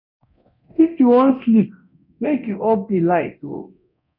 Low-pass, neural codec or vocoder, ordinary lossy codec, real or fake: 5.4 kHz; codec, 24 kHz, 0.9 kbps, DualCodec; none; fake